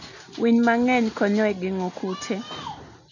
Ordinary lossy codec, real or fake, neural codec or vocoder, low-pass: none; real; none; 7.2 kHz